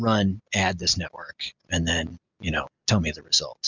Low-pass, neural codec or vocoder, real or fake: 7.2 kHz; none; real